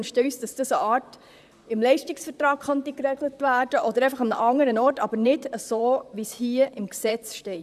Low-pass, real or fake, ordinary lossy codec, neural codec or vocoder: 14.4 kHz; fake; none; vocoder, 44.1 kHz, 128 mel bands, Pupu-Vocoder